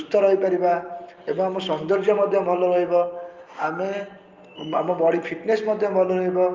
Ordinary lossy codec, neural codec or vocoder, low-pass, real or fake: Opus, 24 kbps; none; 7.2 kHz; real